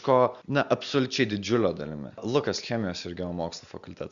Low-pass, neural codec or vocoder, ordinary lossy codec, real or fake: 7.2 kHz; none; Opus, 64 kbps; real